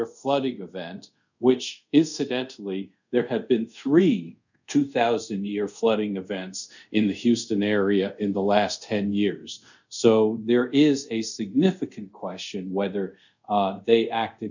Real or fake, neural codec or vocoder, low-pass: fake; codec, 24 kHz, 0.5 kbps, DualCodec; 7.2 kHz